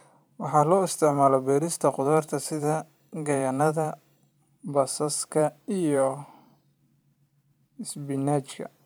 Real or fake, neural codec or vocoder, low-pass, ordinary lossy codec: fake; vocoder, 44.1 kHz, 128 mel bands every 512 samples, BigVGAN v2; none; none